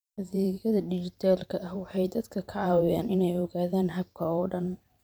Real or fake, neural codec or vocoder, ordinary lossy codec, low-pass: fake; vocoder, 44.1 kHz, 128 mel bands every 512 samples, BigVGAN v2; none; none